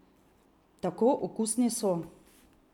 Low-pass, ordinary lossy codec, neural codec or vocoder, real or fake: 19.8 kHz; none; none; real